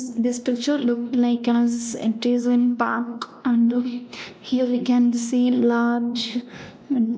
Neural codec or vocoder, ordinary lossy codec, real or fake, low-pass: codec, 16 kHz, 1 kbps, X-Codec, WavLM features, trained on Multilingual LibriSpeech; none; fake; none